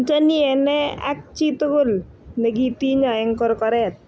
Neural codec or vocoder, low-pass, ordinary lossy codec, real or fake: none; none; none; real